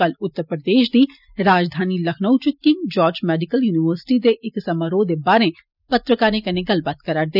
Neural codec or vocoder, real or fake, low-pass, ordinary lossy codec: none; real; 5.4 kHz; none